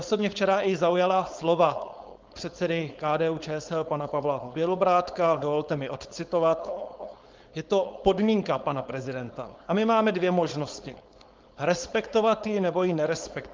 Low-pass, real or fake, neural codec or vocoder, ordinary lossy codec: 7.2 kHz; fake; codec, 16 kHz, 4.8 kbps, FACodec; Opus, 32 kbps